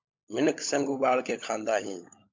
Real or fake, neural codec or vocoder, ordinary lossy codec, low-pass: fake; codec, 16 kHz, 16 kbps, FunCodec, trained on LibriTTS, 50 frames a second; MP3, 64 kbps; 7.2 kHz